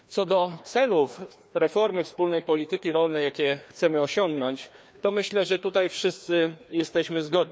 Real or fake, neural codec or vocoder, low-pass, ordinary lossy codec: fake; codec, 16 kHz, 2 kbps, FreqCodec, larger model; none; none